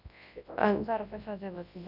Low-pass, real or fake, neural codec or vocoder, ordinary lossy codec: 5.4 kHz; fake; codec, 24 kHz, 0.9 kbps, WavTokenizer, large speech release; none